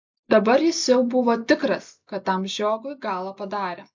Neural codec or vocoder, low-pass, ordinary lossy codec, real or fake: none; 7.2 kHz; MP3, 64 kbps; real